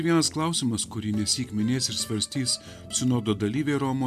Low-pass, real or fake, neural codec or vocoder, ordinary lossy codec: 14.4 kHz; real; none; AAC, 96 kbps